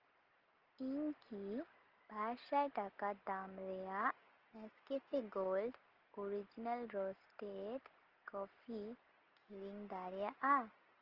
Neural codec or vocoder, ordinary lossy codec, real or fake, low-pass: none; Opus, 16 kbps; real; 5.4 kHz